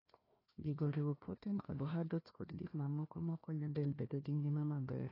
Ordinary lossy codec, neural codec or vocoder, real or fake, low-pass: AAC, 24 kbps; codec, 16 kHz, 1 kbps, FunCodec, trained on Chinese and English, 50 frames a second; fake; 5.4 kHz